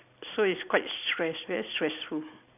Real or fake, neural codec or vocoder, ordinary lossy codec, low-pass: real; none; none; 3.6 kHz